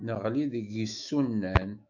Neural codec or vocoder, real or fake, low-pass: autoencoder, 48 kHz, 128 numbers a frame, DAC-VAE, trained on Japanese speech; fake; 7.2 kHz